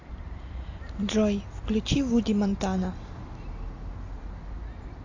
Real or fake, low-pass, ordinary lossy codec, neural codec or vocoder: real; 7.2 kHz; AAC, 48 kbps; none